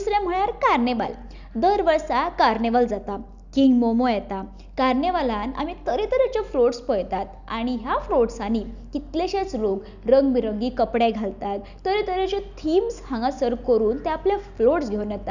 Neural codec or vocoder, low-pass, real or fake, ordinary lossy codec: none; 7.2 kHz; real; none